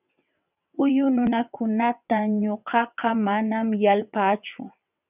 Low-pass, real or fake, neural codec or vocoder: 3.6 kHz; fake; vocoder, 22.05 kHz, 80 mel bands, WaveNeXt